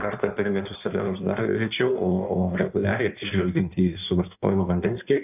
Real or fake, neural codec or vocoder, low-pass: fake; codec, 16 kHz in and 24 kHz out, 1.1 kbps, FireRedTTS-2 codec; 3.6 kHz